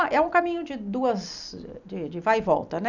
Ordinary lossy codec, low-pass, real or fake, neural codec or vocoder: none; 7.2 kHz; real; none